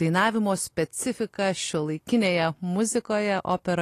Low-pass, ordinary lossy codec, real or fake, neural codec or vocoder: 14.4 kHz; AAC, 48 kbps; real; none